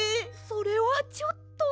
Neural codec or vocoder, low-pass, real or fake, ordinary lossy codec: none; none; real; none